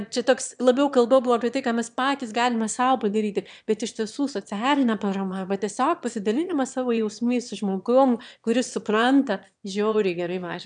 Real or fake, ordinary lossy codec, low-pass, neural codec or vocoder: fake; MP3, 96 kbps; 9.9 kHz; autoencoder, 22.05 kHz, a latent of 192 numbers a frame, VITS, trained on one speaker